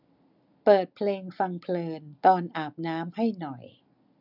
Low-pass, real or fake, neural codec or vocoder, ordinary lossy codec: 5.4 kHz; real; none; none